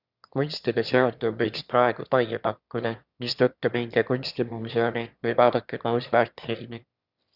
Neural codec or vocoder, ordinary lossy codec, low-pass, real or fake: autoencoder, 22.05 kHz, a latent of 192 numbers a frame, VITS, trained on one speaker; Opus, 64 kbps; 5.4 kHz; fake